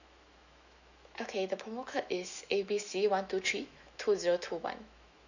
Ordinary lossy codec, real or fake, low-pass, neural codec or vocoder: none; fake; 7.2 kHz; autoencoder, 48 kHz, 128 numbers a frame, DAC-VAE, trained on Japanese speech